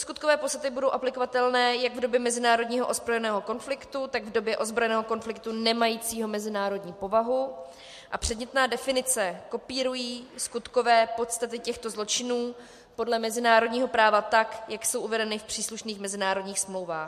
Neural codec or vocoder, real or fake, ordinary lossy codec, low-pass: none; real; MP3, 64 kbps; 14.4 kHz